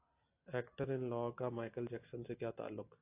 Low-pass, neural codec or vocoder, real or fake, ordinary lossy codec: 3.6 kHz; none; real; AAC, 24 kbps